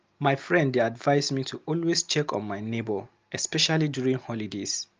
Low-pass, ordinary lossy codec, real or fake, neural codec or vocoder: 7.2 kHz; Opus, 24 kbps; real; none